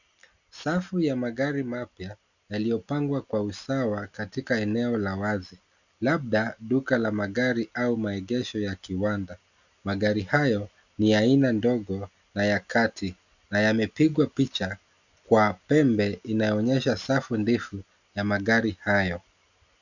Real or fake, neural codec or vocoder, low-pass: real; none; 7.2 kHz